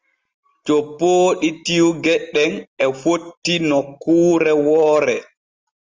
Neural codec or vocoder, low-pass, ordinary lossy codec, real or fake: none; 7.2 kHz; Opus, 32 kbps; real